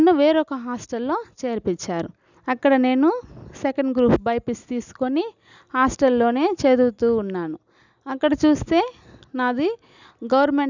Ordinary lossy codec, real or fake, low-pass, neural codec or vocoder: none; real; 7.2 kHz; none